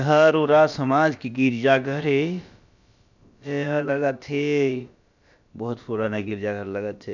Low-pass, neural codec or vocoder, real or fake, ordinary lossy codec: 7.2 kHz; codec, 16 kHz, about 1 kbps, DyCAST, with the encoder's durations; fake; none